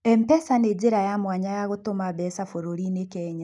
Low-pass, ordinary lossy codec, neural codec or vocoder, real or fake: 10.8 kHz; none; none; real